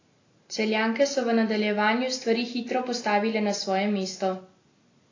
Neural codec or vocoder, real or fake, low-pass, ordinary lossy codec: none; real; 7.2 kHz; AAC, 32 kbps